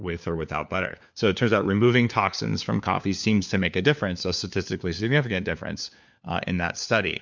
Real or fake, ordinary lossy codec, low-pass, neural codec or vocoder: fake; MP3, 64 kbps; 7.2 kHz; codec, 16 kHz, 4 kbps, FunCodec, trained on LibriTTS, 50 frames a second